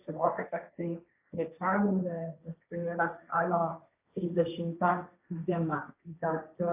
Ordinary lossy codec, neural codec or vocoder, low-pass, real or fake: AAC, 24 kbps; codec, 16 kHz, 1.1 kbps, Voila-Tokenizer; 3.6 kHz; fake